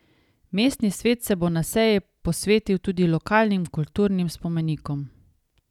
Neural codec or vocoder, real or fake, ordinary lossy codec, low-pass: none; real; none; 19.8 kHz